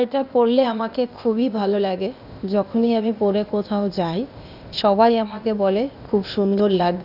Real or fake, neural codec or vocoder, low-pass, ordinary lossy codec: fake; codec, 16 kHz, 0.8 kbps, ZipCodec; 5.4 kHz; none